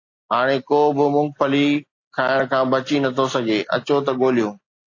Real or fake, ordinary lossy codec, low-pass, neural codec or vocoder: real; AAC, 48 kbps; 7.2 kHz; none